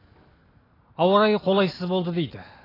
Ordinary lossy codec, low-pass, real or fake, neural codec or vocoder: AAC, 24 kbps; 5.4 kHz; real; none